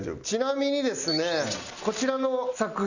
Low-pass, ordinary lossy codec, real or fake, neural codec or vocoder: 7.2 kHz; none; real; none